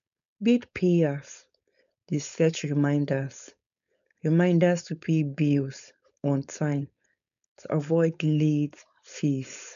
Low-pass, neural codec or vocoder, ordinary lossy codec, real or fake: 7.2 kHz; codec, 16 kHz, 4.8 kbps, FACodec; none; fake